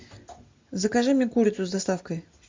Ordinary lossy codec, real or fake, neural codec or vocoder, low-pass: MP3, 48 kbps; real; none; 7.2 kHz